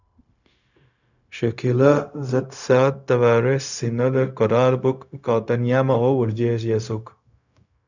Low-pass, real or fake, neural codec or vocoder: 7.2 kHz; fake; codec, 16 kHz, 0.4 kbps, LongCat-Audio-Codec